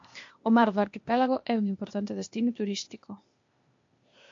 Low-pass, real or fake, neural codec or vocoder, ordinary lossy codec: 7.2 kHz; fake; codec, 16 kHz, 0.8 kbps, ZipCodec; MP3, 48 kbps